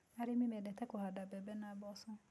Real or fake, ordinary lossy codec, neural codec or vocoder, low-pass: real; none; none; none